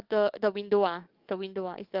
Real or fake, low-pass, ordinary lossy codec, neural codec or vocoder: fake; 5.4 kHz; Opus, 24 kbps; codec, 44.1 kHz, 7.8 kbps, DAC